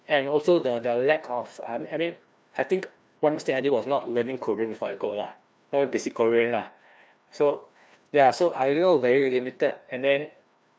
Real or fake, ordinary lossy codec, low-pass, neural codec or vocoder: fake; none; none; codec, 16 kHz, 1 kbps, FreqCodec, larger model